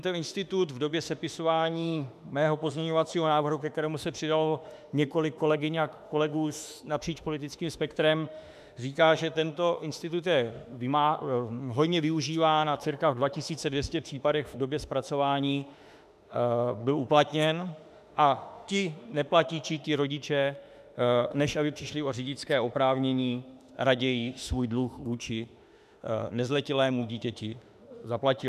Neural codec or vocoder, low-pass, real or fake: autoencoder, 48 kHz, 32 numbers a frame, DAC-VAE, trained on Japanese speech; 14.4 kHz; fake